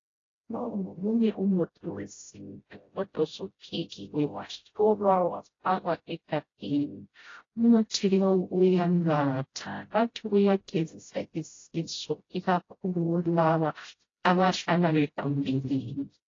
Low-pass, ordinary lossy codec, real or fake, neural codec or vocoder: 7.2 kHz; AAC, 32 kbps; fake; codec, 16 kHz, 0.5 kbps, FreqCodec, smaller model